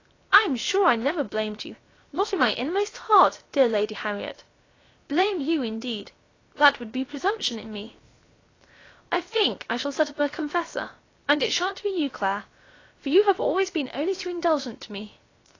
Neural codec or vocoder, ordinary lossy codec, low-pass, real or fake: codec, 16 kHz, 0.7 kbps, FocalCodec; AAC, 32 kbps; 7.2 kHz; fake